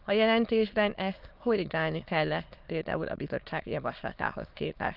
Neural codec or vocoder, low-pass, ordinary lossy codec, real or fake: autoencoder, 22.05 kHz, a latent of 192 numbers a frame, VITS, trained on many speakers; 5.4 kHz; Opus, 24 kbps; fake